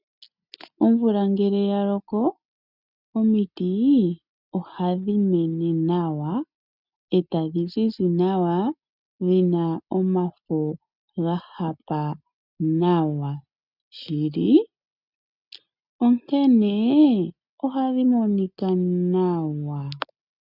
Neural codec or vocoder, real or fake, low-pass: none; real; 5.4 kHz